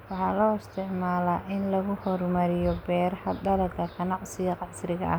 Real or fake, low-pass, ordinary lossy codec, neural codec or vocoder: real; none; none; none